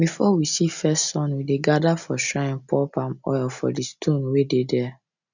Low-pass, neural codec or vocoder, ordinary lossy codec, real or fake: 7.2 kHz; none; none; real